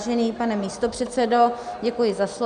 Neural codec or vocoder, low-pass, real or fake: none; 9.9 kHz; real